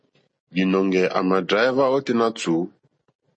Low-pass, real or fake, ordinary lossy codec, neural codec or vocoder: 9.9 kHz; real; MP3, 32 kbps; none